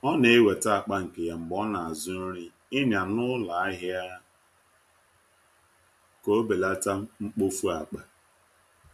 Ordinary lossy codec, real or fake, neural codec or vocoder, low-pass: MP3, 64 kbps; real; none; 14.4 kHz